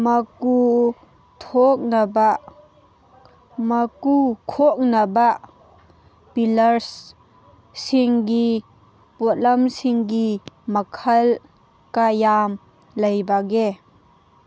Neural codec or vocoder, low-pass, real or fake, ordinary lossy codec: none; none; real; none